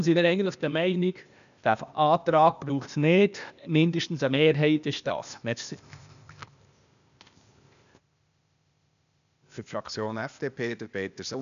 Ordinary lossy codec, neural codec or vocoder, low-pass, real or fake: none; codec, 16 kHz, 0.8 kbps, ZipCodec; 7.2 kHz; fake